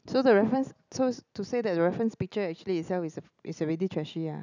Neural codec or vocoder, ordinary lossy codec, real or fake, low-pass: none; none; real; 7.2 kHz